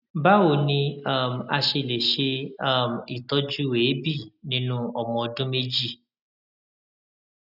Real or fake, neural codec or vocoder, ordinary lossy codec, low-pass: real; none; none; 5.4 kHz